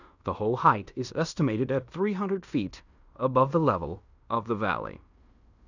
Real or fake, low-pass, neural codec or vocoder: fake; 7.2 kHz; codec, 16 kHz in and 24 kHz out, 0.9 kbps, LongCat-Audio-Codec, fine tuned four codebook decoder